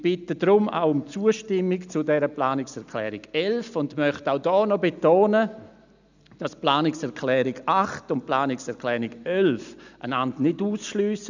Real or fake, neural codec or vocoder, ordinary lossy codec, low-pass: real; none; none; 7.2 kHz